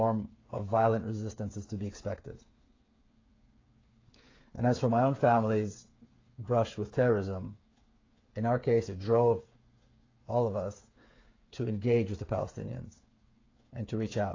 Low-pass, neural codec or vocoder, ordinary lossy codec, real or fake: 7.2 kHz; codec, 16 kHz, 8 kbps, FreqCodec, smaller model; AAC, 32 kbps; fake